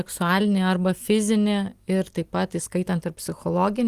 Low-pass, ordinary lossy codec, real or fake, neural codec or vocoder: 14.4 kHz; Opus, 24 kbps; real; none